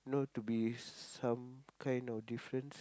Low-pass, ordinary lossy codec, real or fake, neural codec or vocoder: none; none; real; none